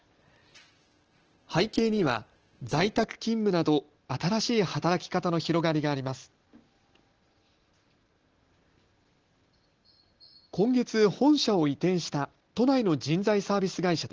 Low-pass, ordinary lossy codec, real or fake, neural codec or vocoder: 7.2 kHz; Opus, 16 kbps; real; none